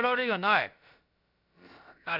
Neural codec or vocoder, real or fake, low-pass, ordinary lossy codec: codec, 16 kHz, about 1 kbps, DyCAST, with the encoder's durations; fake; 5.4 kHz; MP3, 48 kbps